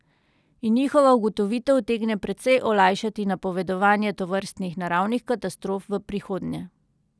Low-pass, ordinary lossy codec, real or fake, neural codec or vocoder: none; none; real; none